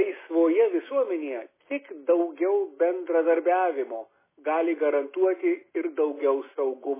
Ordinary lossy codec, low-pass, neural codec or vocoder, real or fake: MP3, 16 kbps; 3.6 kHz; none; real